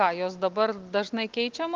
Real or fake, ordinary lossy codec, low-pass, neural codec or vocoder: real; Opus, 32 kbps; 7.2 kHz; none